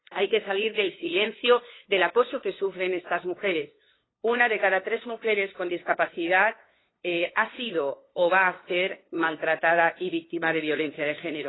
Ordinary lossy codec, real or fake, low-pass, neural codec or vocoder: AAC, 16 kbps; fake; 7.2 kHz; codec, 16 kHz, 2 kbps, FunCodec, trained on LibriTTS, 25 frames a second